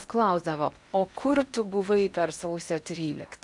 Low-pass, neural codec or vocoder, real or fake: 10.8 kHz; codec, 16 kHz in and 24 kHz out, 0.8 kbps, FocalCodec, streaming, 65536 codes; fake